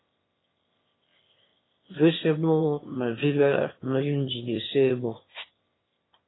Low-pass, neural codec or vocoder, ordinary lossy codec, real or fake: 7.2 kHz; autoencoder, 22.05 kHz, a latent of 192 numbers a frame, VITS, trained on one speaker; AAC, 16 kbps; fake